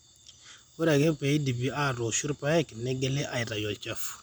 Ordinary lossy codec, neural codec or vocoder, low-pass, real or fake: none; none; none; real